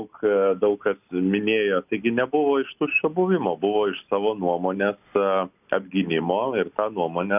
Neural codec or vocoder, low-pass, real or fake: none; 3.6 kHz; real